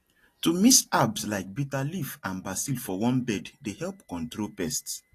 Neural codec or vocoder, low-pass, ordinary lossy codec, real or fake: none; 14.4 kHz; AAC, 48 kbps; real